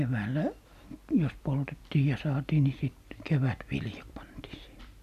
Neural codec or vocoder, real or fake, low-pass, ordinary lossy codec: none; real; 14.4 kHz; none